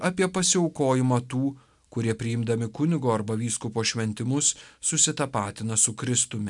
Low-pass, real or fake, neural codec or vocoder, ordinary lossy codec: 10.8 kHz; real; none; MP3, 96 kbps